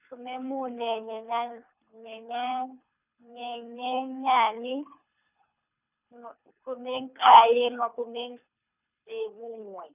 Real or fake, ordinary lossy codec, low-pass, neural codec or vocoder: fake; none; 3.6 kHz; codec, 24 kHz, 3 kbps, HILCodec